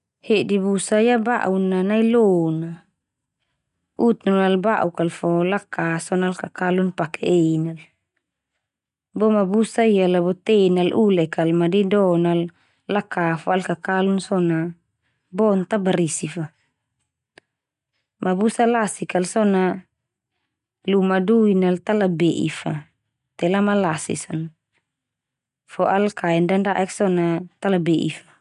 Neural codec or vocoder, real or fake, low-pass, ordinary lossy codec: none; real; 10.8 kHz; none